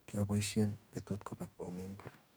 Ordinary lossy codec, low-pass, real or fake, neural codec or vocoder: none; none; fake; codec, 44.1 kHz, 2.6 kbps, DAC